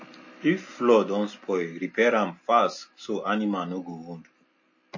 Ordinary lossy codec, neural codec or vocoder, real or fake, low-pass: MP3, 32 kbps; none; real; 7.2 kHz